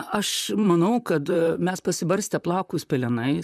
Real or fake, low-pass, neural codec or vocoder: fake; 14.4 kHz; vocoder, 44.1 kHz, 128 mel bands, Pupu-Vocoder